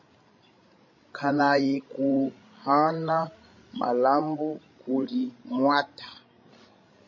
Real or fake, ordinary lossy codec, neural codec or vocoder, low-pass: fake; MP3, 32 kbps; codec, 16 kHz, 16 kbps, FreqCodec, larger model; 7.2 kHz